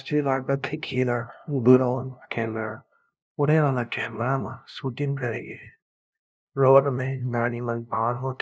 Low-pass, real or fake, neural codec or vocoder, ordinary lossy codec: none; fake; codec, 16 kHz, 0.5 kbps, FunCodec, trained on LibriTTS, 25 frames a second; none